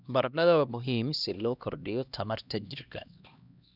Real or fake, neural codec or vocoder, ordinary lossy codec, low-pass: fake; codec, 16 kHz, 1 kbps, X-Codec, HuBERT features, trained on LibriSpeech; none; 5.4 kHz